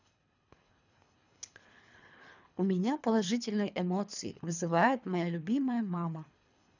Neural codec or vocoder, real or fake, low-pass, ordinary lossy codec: codec, 24 kHz, 3 kbps, HILCodec; fake; 7.2 kHz; none